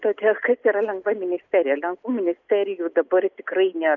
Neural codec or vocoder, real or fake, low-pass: none; real; 7.2 kHz